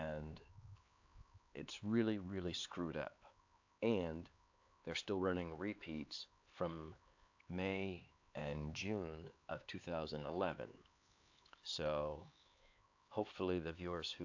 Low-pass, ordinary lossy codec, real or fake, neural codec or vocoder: 7.2 kHz; AAC, 48 kbps; fake; codec, 16 kHz, 4 kbps, X-Codec, HuBERT features, trained on LibriSpeech